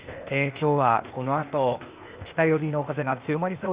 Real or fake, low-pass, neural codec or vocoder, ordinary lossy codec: fake; 3.6 kHz; codec, 16 kHz, 0.8 kbps, ZipCodec; Opus, 64 kbps